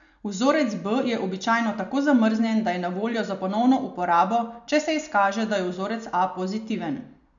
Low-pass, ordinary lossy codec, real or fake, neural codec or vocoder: 7.2 kHz; none; real; none